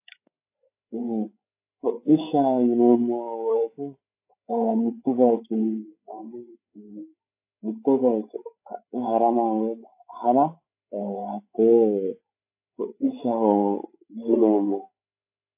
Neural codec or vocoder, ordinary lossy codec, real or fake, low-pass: codec, 16 kHz, 8 kbps, FreqCodec, larger model; AAC, 24 kbps; fake; 3.6 kHz